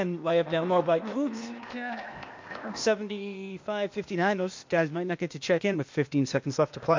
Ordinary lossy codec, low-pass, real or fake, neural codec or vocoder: MP3, 48 kbps; 7.2 kHz; fake; codec, 16 kHz, 0.8 kbps, ZipCodec